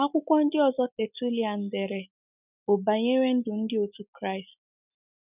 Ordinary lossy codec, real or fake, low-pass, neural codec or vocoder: none; real; 3.6 kHz; none